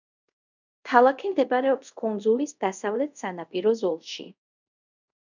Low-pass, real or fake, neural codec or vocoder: 7.2 kHz; fake; codec, 24 kHz, 0.5 kbps, DualCodec